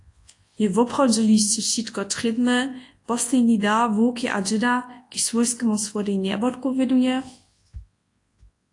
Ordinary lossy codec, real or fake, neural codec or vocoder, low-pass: AAC, 48 kbps; fake; codec, 24 kHz, 0.9 kbps, WavTokenizer, large speech release; 10.8 kHz